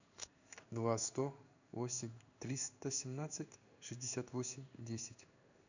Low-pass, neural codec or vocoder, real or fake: 7.2 kHz; codec, 16 kHz in and 24 kHz out, 1 kbps, XY-Tokenizer; fake